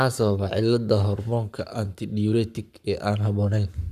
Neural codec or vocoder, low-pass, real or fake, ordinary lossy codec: codec, 44.1 kHz, 7.8 kbps, Pupu-Codec; 14.4 kHz; fake; none